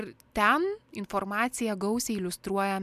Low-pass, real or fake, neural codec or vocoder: 14.4 kHz; real; none